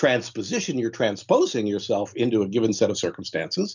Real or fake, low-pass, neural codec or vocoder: real; 7.2 kHz; none